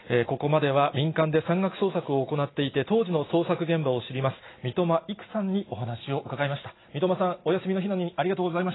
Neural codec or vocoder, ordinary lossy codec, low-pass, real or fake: none; AAC, 16 kbps; 7.2 kHz; real